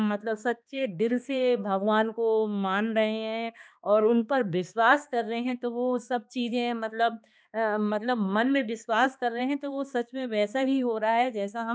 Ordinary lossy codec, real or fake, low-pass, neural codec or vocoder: none; fake; none; codec, 16 kHz, 2 kbps, X-Codec, HuBERT features, trained on balanced general audio